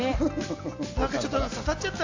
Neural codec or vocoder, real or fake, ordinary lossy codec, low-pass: none; real; AAC, 48 kbps; 7.2 kHz